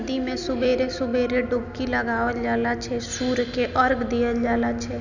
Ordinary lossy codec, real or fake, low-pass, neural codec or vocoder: none; real; 7.2 kHz; none